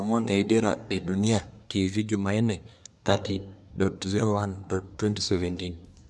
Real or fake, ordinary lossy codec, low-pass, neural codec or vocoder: fake; none; none; codec, 24 kHz, 1 kbps, SNAC